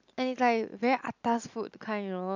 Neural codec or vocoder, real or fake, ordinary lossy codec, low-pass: none; real; none; 7.2 kHz